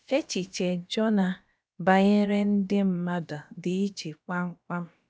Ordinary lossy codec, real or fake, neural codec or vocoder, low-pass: none; fake; codec, 16 kHz, about 1 kbps, DyCAST, with the encoder's durations; none